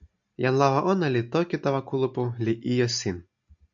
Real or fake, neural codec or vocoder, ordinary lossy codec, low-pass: real; none; AAC, 64 kbps; 7.2 kHz